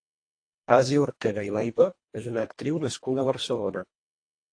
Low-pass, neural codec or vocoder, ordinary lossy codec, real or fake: 9.9 kHz; codec, 24 kHz, 1.5 kbps, HILCodec; AAC, 48 kbps; fake